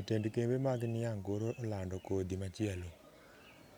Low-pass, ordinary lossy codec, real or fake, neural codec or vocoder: none; none; real; none